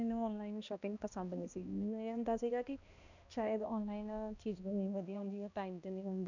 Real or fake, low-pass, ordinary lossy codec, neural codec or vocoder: fake; 7.2 kHz; none; codec, 16 kHz, 1 kbps, X-Codec, HuBERT features, trained on balanced general audio